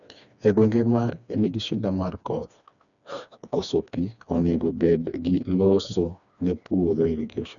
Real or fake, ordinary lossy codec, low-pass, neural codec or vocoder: fake; none; 7.2 kHz; codec, 16 kHz, 2 kbps, FreqCodec, smaller model